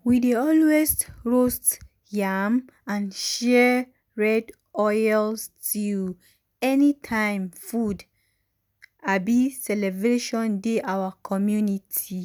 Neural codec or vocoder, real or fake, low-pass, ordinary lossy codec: none; real; none; none